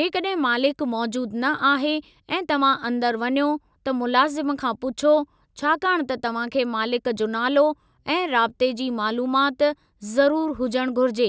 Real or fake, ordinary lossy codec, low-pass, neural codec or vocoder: real; none; none; none